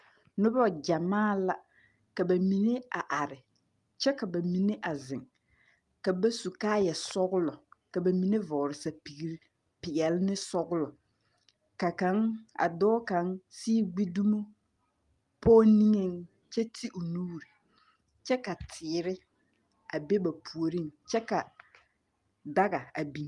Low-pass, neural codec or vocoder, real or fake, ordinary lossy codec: 10.8 kHz; none; real; Opus, 32 kbps